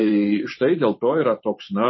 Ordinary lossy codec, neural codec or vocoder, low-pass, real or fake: MP3, 24 kbps; vocoder, 44.1 kHz, 128 mel bands every 512 samples, BigVGAN v2; 7.2 kHz; fake